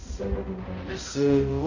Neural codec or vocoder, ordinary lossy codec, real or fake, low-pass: codec, 16 kHz, 0.5 kbps, X-Codec, HuBERT features, trained on balanced general audio; none; fake; 7.2 kHz